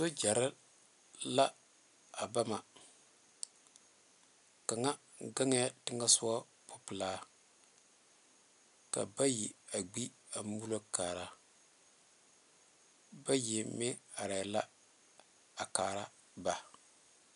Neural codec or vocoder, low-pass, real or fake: none; 10.8 kHz; real